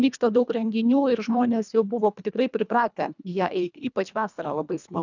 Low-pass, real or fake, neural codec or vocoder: 7.2 kHz; fake; codec, 24 kHz, 1.5 kbps, HILCodec